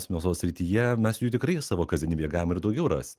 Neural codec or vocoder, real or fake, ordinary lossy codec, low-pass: none; real; Opus, 24 kbps; 14.4 kHz